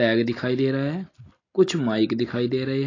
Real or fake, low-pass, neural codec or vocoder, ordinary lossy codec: real; 7.2 kHz; none; AAC, 32 kbps